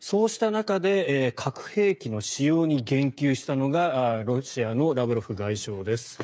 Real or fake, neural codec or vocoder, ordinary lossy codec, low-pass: fake; codec, 16 kHz, 8 kbps, FreqCodec, smaller model; none; none